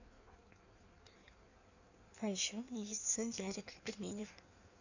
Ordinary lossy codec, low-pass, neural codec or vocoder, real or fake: none; 7.2 kHz; codec, 16 kHz in and 24 kHz out, 1.1 kbps, FireRedTTS-2 codec; fake